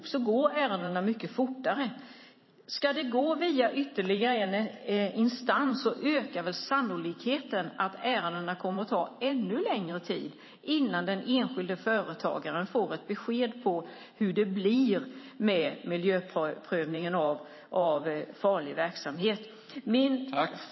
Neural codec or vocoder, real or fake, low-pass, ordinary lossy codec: vocoder, 44.1 kHz, 128 mel bands every 512 samples, BigVGAN v2; fake; 7.2 kHz; MP3, 24 kbps